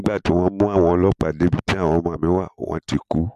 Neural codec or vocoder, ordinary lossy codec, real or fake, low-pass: none; MP3, 64 kbps; real; 14.4 kHz